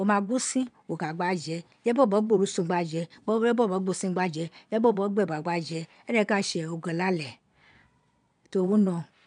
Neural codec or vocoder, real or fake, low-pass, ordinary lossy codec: vocoder, 22.05 kHz, 80 mel bands, Vocos; fake; 9.9 kHz; none